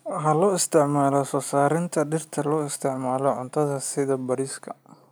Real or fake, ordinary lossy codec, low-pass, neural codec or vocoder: real; none; none; none